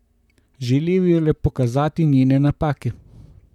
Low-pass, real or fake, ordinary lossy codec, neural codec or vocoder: 19.8 kHz; fake; none; vocoder, 44.1 kHz, 128 mel bands, Pupu-Vocoder